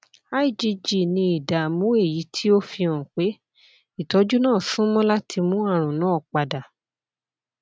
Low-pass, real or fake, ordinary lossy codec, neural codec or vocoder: none; real; none; none